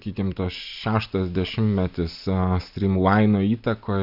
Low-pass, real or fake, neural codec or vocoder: 5.4 kHz; real; none